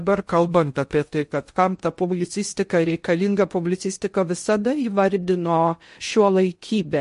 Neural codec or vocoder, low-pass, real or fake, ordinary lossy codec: codec, 16 kHz in and 24 kHz out, 0.6 kbps, FocalCodec, streaming, 2048 codes; 10.8 kHz; fake; MP3, 48 kbps